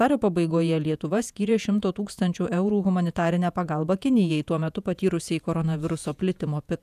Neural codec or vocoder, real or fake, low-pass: vocoder, 48 kHz, 128 mel bands, Vocos; fake; 14.4 kHz